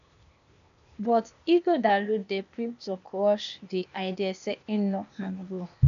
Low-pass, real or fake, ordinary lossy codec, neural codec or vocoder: 7.2 kHz; fake; none; codec, 16 kHz, 0.8 kbps, ZipCodec